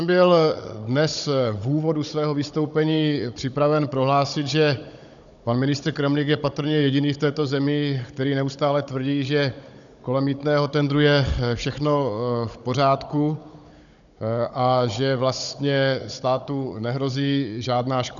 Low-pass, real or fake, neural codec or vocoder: 7.2 kHz; fake; codec, 16 kHz, 16 kbps, FunCodec, trained on Chinese and English, 50 frames a second